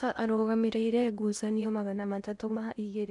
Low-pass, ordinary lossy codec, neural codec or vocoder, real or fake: 10.8 kHz; none; codec, 16 kHz in and 24 kHz out, 0.8 kbps, FocalCodec, streaming, 65536 codes; fake